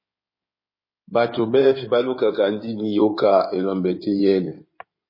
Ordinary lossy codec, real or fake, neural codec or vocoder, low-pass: MP3, 24 kbps; fake; codec, 16 kHz in and 24 kHz out, 2.2 kbps, FireRedTTS-2 codec; 5.4 kHz